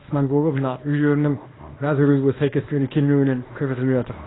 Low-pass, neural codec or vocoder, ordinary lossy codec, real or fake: 7.2 kHz; codec, 24 kHz, 0.9 kbps, WavTokenizer, small release; AAC, 16 kbps; fake